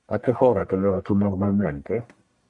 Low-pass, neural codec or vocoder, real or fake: 10.8 kHz; codec, 44.1 kHz, 1.7 kbps, Pupu-Codec; fake